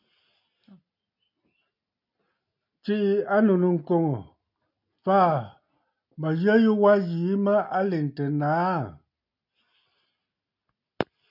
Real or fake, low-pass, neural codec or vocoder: real; 5.4 kHz; none